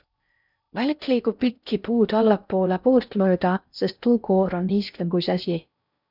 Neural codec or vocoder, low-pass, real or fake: codec, 16 kHz in and 24 kHz out, 0.6 kbps, FocalCodec, streaming, 4096 codes; 5.4 kHz; fake